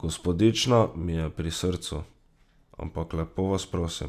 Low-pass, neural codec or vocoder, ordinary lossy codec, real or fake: 14.4 kHz; none; none; real